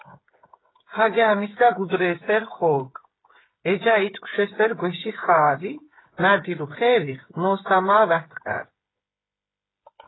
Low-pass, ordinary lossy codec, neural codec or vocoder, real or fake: 7.2 kHz; AAC, 16 kbps; codec, 16 kHz, 8 kbps, FreqCodec, smaller model; fake